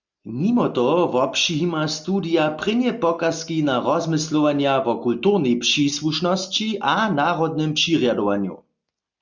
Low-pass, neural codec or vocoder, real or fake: 7.2 kHz; none; real